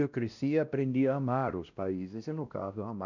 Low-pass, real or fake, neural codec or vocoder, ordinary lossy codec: 7.2 kHz; fake; codec, 16 kHz, 1 kbps, X-Codec, WavLM features, trained on Multilingual LibriSpeech; none